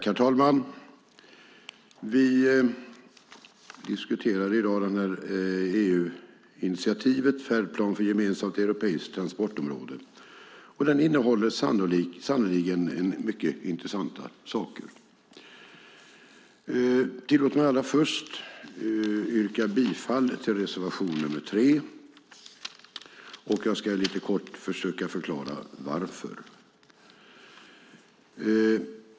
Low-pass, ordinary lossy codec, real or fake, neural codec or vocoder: none; none; real; none